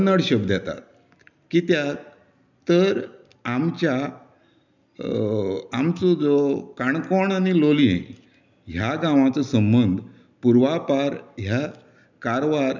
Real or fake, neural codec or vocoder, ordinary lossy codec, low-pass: real; none; none; 7.2 kHz